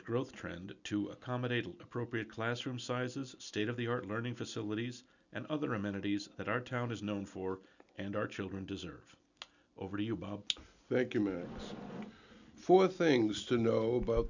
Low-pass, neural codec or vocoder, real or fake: 7.2 kHz; none; real